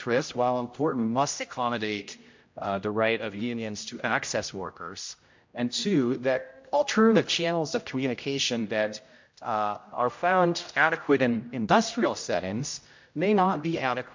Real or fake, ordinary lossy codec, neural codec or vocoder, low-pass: fake; MP3, 48 kbps; codec, 16 kHz, 0.5 kbps, X-Codec, HuBERT features, trained on general audio; 7.2 kHz